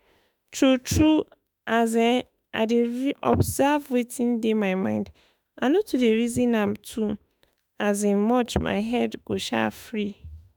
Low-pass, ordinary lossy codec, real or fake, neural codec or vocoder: none; none; fake; autoencoder, 48 kHz, 32 numbers a frame, DAC-VAE, trained on Japanese speech